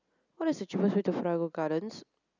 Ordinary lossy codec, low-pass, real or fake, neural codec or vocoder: none; 7.2 kHz; real; none